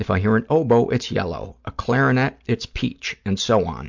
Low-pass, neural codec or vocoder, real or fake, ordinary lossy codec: 7.2 kHz; none; real; MP3, 64 kbps